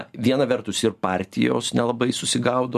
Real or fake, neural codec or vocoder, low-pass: real; none; 14.4 kHz